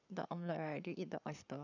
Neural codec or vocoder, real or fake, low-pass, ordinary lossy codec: codec, 44.1 kHz, 7.8 kbps, Pupu-Codec; fake; 7.2 kHz; none